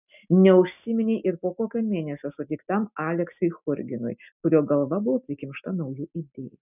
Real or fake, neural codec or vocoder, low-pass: real; none; 3.6 kHz